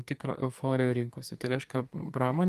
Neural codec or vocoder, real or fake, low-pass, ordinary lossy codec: codec, 44.1 kHz, 2.6 kbps, SNAC; fake; 14.4 kHz; Opus, 32 kbps